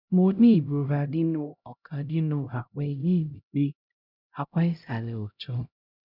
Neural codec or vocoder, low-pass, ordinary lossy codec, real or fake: codec, 16 kHz, 0.5 kbps, X-Codec, HuBERT features, trained on LibriSpeech; 5.4 kHz; none; fake